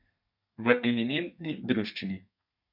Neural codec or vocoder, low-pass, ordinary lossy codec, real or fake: codec, 32 kHz, 1.9 kbps, SNAC; 5.4 kHz; none; fake